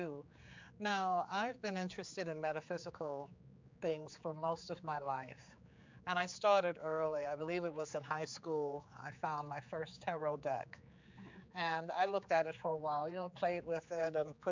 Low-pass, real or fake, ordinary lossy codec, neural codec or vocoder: 7.2 kHz; fake; MP3, 64 kbps; codec, 16 kHz, 4 kbps, X-Codec, HuBERT features, trained on general audio